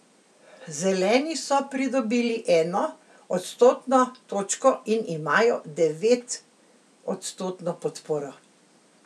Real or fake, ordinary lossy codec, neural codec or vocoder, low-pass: real; none; none; none